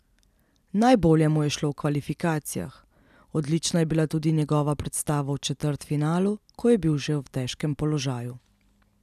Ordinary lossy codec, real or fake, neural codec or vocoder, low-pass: none; real; none; 14.4 kHz